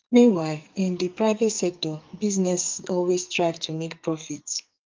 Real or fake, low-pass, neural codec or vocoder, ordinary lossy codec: fake; 7.2 kHz; codec, 44.1 kHz, 2.6 kbps, SNAC; Opus, 24 kbps